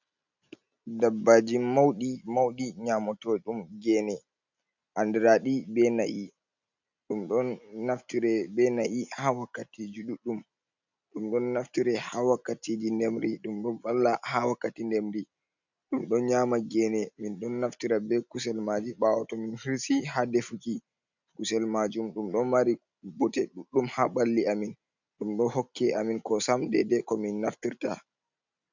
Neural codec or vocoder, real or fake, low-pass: none; real; 7.2 kHz